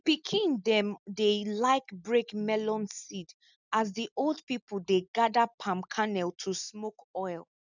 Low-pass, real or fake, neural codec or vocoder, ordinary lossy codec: 7.2 kHz; real; none; none